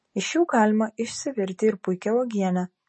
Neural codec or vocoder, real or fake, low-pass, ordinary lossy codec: none; real; 9.9 kHz; MP3, 32 kbps